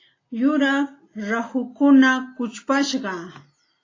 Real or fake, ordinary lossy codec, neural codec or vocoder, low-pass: real; AAC, 32 kbps; none; 7.2 kHz